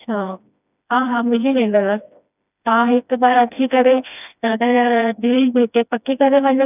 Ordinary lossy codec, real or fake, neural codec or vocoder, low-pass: none; fake; codec, 16 kHz, 1 kbps, FreqCodec, smaller model; 3.6 kHz